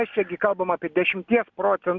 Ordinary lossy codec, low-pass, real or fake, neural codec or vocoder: MP3, 64 kbps; 7.2 kHz; real; none